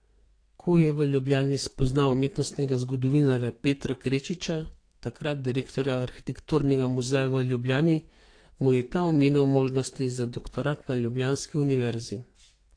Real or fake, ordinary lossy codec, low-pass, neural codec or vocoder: fake; AAC, 48 kbps; 9.9 kHz; codec, 32 kHz, 1.9 kbps, SNAC